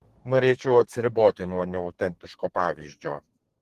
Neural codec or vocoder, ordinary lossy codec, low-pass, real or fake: codec, 32 kHz, 1.9 kbps, SNAC; Opus, 16 kbps; 14.4 kHz; fake